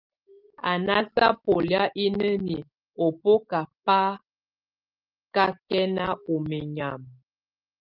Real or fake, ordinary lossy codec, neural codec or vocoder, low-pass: real; Opus, 24 kbps; none; 5.4 kHz